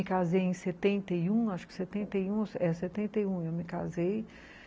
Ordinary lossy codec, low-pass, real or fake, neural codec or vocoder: none; none; real; none